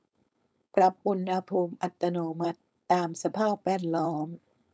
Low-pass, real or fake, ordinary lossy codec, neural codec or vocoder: none; fake; none; codec, 16 kHz, 4.8 kbps, FACodec